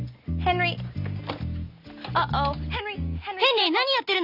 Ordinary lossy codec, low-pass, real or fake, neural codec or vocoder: none; 5.4 kHz; real; none